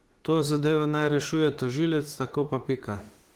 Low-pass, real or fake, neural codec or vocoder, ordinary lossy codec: 19.8 kHz; fake; autoencoder, 48 kHz, 32 numbers a frame, DAC-VAE, trained on Japanese speech; Opus, 16 kbps